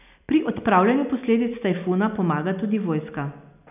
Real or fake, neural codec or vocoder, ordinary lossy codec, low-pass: real; none; none; 3.6 kHz